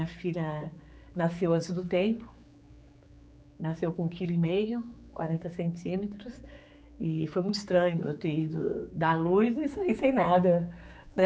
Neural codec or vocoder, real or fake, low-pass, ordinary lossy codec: codec, 16 kHz, 4 kbps, X-Codec, HuBERT features, trained on general audio; fake; none; none